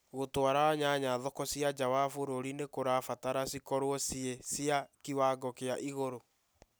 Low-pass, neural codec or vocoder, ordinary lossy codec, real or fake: none; none; none; real